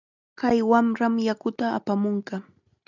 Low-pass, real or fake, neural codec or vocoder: 7.2 kHz; real; none